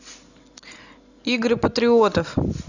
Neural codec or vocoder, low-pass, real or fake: none; 7.2 kHz; real